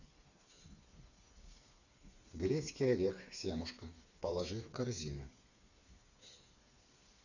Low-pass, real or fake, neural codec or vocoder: 7.2 kHz; fake; codec, 16 kHz, 4 kbps, FreqCodec, smaller model